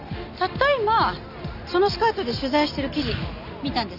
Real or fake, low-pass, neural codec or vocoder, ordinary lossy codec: real; 5.4 kHz; none; none